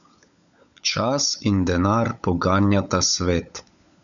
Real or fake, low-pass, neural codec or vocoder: fake; 7.2 kHz; codec, 16 kHz, 16 kbps, FunCodec, trained on LibriTTS, 50 frames a second